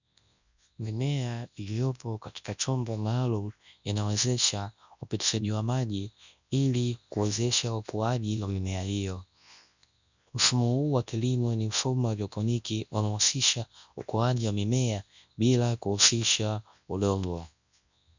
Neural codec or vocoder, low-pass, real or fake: codec, 24 kHz, 0.9 kbps, WavTokenizer, large speech release; 7.2 kHz; fake